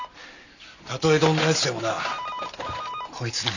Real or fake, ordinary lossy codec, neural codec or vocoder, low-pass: real; none; none; 7.2 kHz